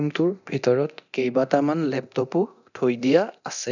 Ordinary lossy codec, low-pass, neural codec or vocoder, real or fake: none; 7.2 kHz; codec, 24 kHz, 0.9 kbps, DualCodec; fake